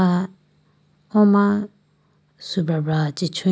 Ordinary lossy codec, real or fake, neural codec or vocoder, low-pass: none; real; none; none